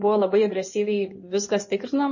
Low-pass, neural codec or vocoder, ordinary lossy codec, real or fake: 7.2 kHz; codec, 16 kHz in and 24 kHz out, 2.2 kbps, FireRedTTS-2 codec; MP3, 32 kbps; fake